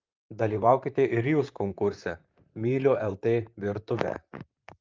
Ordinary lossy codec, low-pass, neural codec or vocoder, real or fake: Opus, 24 kbps; 7.2 kHz; vocoder, 44.1 kHz, 128 mel bands, Pupu-Vocoder; fake